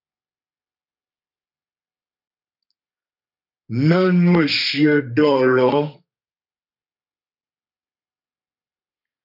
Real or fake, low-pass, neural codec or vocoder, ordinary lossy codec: fake; 5.4 kHz; codec, 32 kHz, 1.9 kbps, SNAC; MP3, 48 kbps